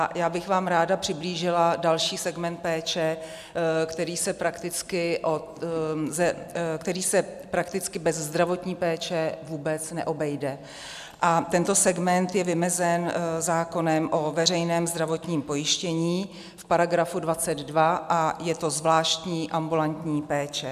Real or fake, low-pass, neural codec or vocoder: real; 14.4 kHz; none